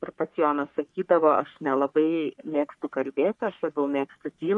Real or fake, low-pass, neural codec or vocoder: fake; 10.8 kHz; codec, 44.1 kHz, 3.4 kbps, Pupu-Codec